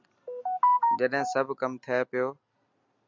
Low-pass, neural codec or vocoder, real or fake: 7.2 kHz; none; real